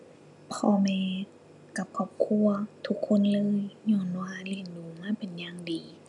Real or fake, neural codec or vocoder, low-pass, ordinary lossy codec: real; none; 10.8 kHz; none